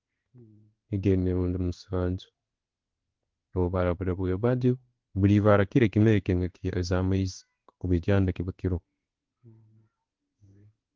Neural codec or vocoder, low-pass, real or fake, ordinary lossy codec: codec, 16 kHz in and 24 kHz out, 1 kbps, XY-Tokenizer; 7.2 kHz; fake; Opus, 16 kbps